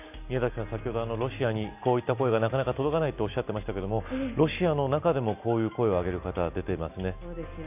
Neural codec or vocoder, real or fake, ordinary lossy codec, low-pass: none; real; none; 3.6 kHz